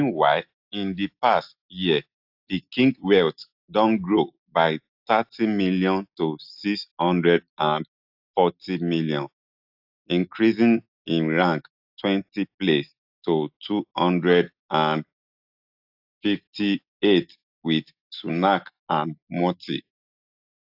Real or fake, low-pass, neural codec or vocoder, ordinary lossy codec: real; 5.4 kHz; none; AAC, 48 kbps